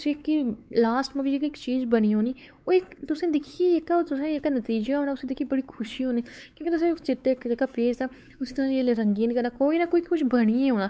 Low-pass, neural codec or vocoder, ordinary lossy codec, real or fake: none; codec, 16 kHz, 4 kbps, X-Codec, WavLM features, trained on Multilingual LibriSpeech; none; fake